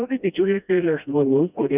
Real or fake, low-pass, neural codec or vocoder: fake; 3.6 kHz; codec, 16 kHz, 1 kbps, FreqCodec, smaller model